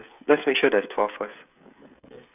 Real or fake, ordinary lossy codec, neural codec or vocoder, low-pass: fake; none; codec, 16 kHz, 16 kbps, FreqCodec, smaller model; 3.6 kHz